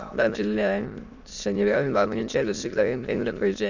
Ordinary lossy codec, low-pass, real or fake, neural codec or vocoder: Opus, 64 kbps; 7.2 kHz; fake; autoencoder, 22.05 kHz, a latent of 192 numbers a frame, VITS, trained on many speakers